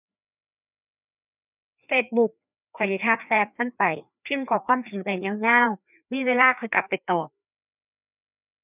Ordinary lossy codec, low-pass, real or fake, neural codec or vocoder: none; 3.6 kHz; fake; codec, 16 kHz, 2 kbps, FreqCodec, larger model